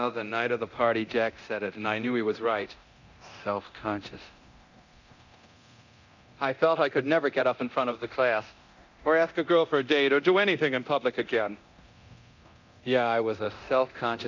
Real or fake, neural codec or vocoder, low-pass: fake; codec, 24 kHz, 0.9 kbps, DualCodec; 7.2 kHz